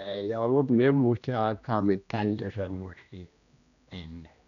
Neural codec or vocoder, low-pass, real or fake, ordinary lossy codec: codec, 16 kHz, 1 kbps, X-Codec, HuBERT features, trained on general audio; 7.2 kHz; fake; none